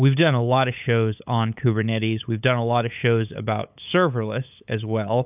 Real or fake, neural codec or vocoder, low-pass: fake; codec, 16 kHz, 8 kbps, FunCodec, trained on LibriTTS, 25 frames a second; 3.6 kHz